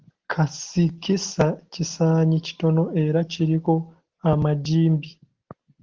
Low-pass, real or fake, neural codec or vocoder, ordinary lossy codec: 7.2 kHz; real; none; Opus, 16 kbps